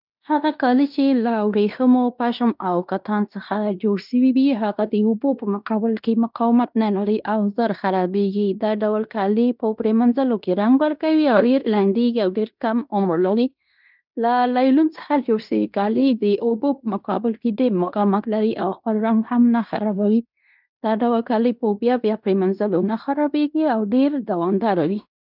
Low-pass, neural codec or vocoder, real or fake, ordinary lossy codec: 5.4 kHz; codec, 16 kHz in and 24 kHz out, 0.9 kbps, LongCat-Audio-Codec, fine tuned four codebook decoder; fake; none